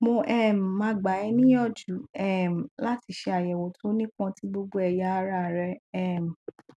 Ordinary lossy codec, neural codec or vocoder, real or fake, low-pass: none; none; real; none